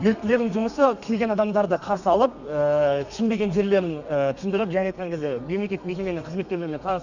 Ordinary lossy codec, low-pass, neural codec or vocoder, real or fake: none; 7.2 kHz; codec, 32 kHz, 1.9 kbps, SNAC; fake